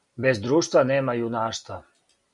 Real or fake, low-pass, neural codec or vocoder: real; 10.8 kHz; none